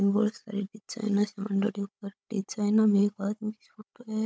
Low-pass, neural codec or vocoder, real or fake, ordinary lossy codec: none; codec, 16 kHz, 16 kbps, FunCodec, trained on Chinese and English, 50 frames a second; fake; none